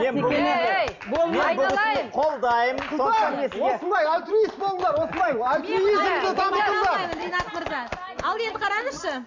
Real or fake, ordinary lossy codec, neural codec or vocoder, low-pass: real; MP3, 64 kbps; none; 7.2 kHz